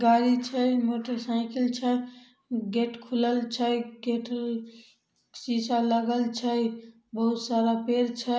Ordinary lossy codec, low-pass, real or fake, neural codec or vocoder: none; none; real; none